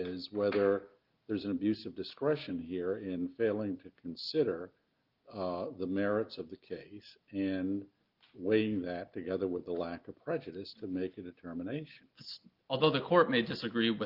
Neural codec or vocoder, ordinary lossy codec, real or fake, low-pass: none; Opus, 32 kbps; real; 5.4 kHz